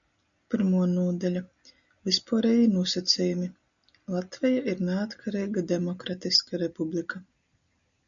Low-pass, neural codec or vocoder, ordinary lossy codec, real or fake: 7.2 kHz; none; MP3, 96 kbps; real